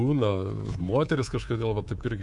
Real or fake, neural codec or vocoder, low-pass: fake; codec, 24 kHz, 3.1 kbps, DualCodec; 10.8 kHz